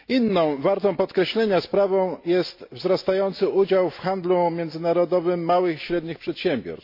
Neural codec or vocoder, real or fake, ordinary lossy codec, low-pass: none; real; MP3, 32 kbps; 5.4 kHz